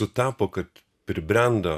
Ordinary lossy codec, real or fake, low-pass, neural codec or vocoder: AAC, 96 kbps; real; 14.4 kHz; none